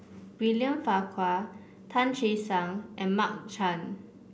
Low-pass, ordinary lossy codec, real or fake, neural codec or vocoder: none; none; real; none